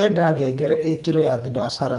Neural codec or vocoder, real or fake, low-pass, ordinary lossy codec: codec, 24 kHz, 1.5 kbps, HILCodec; fake; 10.8 kHz; none